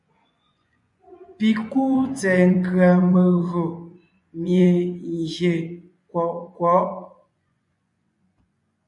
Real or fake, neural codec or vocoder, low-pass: fake; vocoder, 24 kHz, 100 mel bands, Vocos; 10.8 kHz